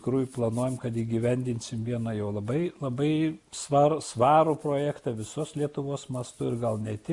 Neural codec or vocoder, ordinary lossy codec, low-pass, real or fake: none; Opus, 64 kbps; 10.8 kHz; real